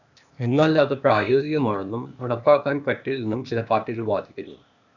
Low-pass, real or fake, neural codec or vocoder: 7.2 kHz; fake; codec, 16 kHz, 0.8 kbps, ZipCodec